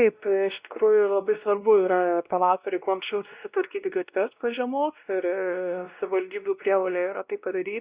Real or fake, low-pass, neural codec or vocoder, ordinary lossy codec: fake; 3.6 kHz; codec, 16 kHz, 1 kbps, X-Codec, WavLM features, trained on Multilingual LibriSpeech; Opus, 64 kbps